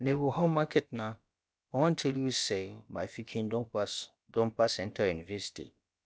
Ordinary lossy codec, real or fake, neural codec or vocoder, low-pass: none; fake; codec, 16 kHz, about 1 kbps, DyCAST, with the encoder's durations; none